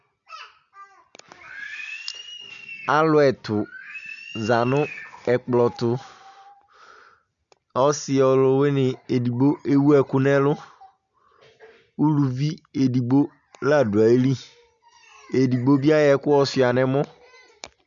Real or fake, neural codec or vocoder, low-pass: real; none; 7.2 kHz